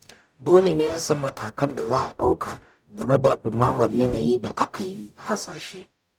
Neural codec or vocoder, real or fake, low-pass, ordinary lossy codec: codec, 44.1 kHz, 0.9 kbps, DAC; fake; 19.8 kHz; none